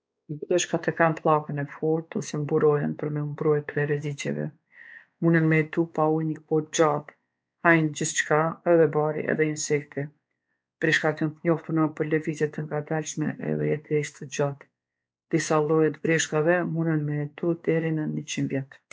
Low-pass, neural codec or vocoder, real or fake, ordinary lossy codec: none; codec, 16 kHz, 4 kbps, X-Codec, WavLM features, trained on Multilingual LibriSpeech; fake; none